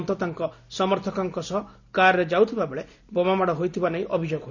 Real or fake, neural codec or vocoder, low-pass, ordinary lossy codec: real; none; 7.2 kHz; none